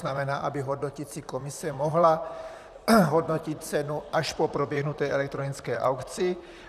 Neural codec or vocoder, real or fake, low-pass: vocoder, 44.1 kHz, 128 mel bands, Pupu-Vocoder; fake; 14.4 kHz